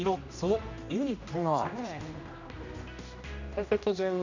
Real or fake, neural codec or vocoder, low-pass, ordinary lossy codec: fake; codec, 16 kHz, 1 kbps, X-Codec, HuBERT features, trained on general audio; 7.2 kHz; none